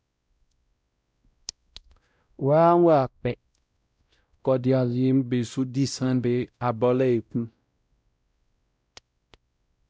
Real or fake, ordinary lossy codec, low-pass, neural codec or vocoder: fake; none; none; codec, 16 kHz, 0.5 kbps, X-Codec, WavLM features, trained on Multilingual LibriSpeech